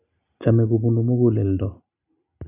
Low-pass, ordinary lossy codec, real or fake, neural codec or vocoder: 3.6 kHz; none; real; none